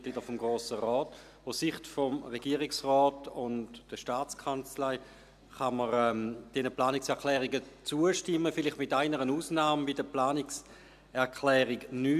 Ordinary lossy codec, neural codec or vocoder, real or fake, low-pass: AAC, 96 kbps; none; real; 14.4 kHz